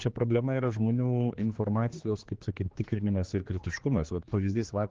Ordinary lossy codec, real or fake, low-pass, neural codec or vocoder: Opus, 16 kbps; fake; 7.2 kHz; codec, 16 kHz, 2 kbps, X-Codec, HuBERT features, trained on general audio